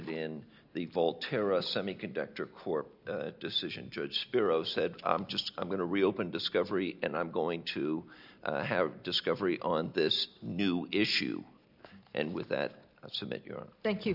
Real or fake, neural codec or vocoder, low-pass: real; none; 5.4 kHz